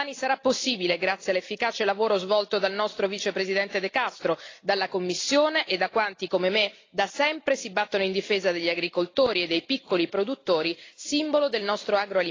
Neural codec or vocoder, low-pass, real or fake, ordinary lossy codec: none; 7.2 kHz; real; AAC, 32 kbps